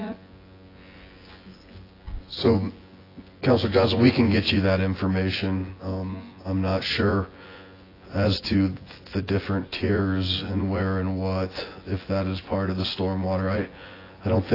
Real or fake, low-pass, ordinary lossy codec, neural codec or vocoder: fake; 5.4 kHz; AAC, 24 kbps; vocoder, 24 kHz, 100 mel bands, Vocos